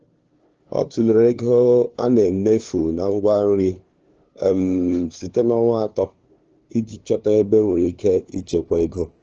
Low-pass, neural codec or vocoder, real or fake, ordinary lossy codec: 7.2 kHz; codec, 16 kHz, 2 kbps, FunCodec, trained on LibriTTS, 25 frames a second; fake; Opus, 16 kbps